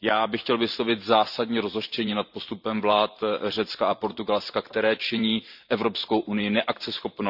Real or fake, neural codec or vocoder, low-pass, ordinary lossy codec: fake; vocoder, 44.1 kHz, 128 mel bands every 512 samples, BigVGAN v2; 5.4 kHz; none